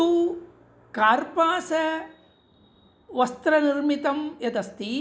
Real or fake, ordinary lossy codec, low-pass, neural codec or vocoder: real; none; none; none